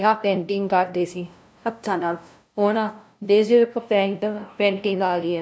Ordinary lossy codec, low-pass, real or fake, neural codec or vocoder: none; none; fake; codec, 16 kHz, 0.5 kbps, FunCodec, trained on LibriTTS, 25 frames a second